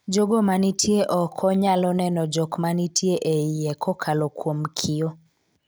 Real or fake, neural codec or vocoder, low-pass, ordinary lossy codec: real; none; none; none